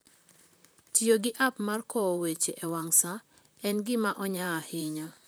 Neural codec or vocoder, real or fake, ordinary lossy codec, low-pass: vocoder, 44.1 kHz, 128 mel bands, Pupu-Vocoder; fake; none; none